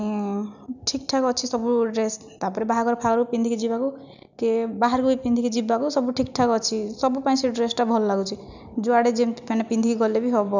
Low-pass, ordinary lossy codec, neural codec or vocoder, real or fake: 7.2 kHz; none; none; real